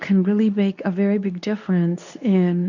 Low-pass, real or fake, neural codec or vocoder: 7.2 kHz; fake; codec, 24 kHz, 0.9 kbps, WavTokenizer, medium speech release version 2